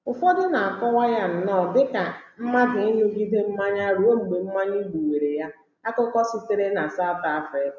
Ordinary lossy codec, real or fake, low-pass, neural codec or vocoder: none; real; 7.2 kHz; none